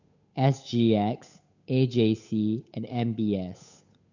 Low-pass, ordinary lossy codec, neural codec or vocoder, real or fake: 7.2 kHz; none; codec, 16 kHz, 8 kbps, FunCodec, trained on Chinese and English, 25 frames a second; fake